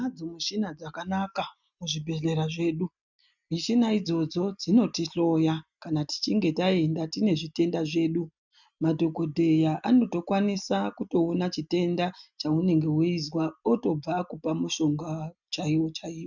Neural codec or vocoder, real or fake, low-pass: none; real; 7.2 kHz